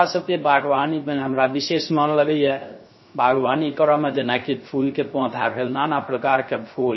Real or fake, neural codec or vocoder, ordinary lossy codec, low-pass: fake; codec, 16 kHz, 0.3 kbps, FocalCodec; MP3, 24 kbps; 7.2 kHz